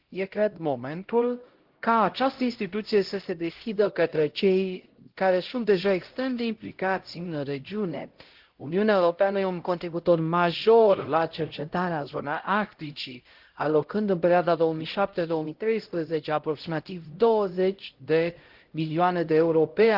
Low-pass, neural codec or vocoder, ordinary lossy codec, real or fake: 5.4 kHz; codec, 16 kHz, 0.5 kbps, X-Codec, HuBERT features, trained on LibriSpeech; Opus, 16 kbps; fake